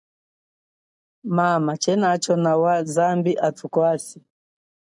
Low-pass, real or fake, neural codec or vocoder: 10.8 kHz; real; none